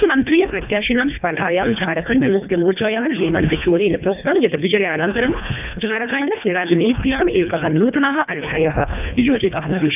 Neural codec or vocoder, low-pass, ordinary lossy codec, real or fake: codec, 24 kHz, 1.5 kbps, HILCodec; 3.6 kHz; none; fake